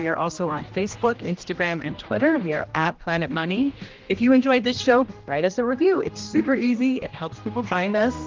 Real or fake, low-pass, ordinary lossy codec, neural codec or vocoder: fake; 7.2 kHz; Opus, 24 kbps; codec, 16 kHz, 1 kbps, X-Codec, HuBERT features, trained on general audio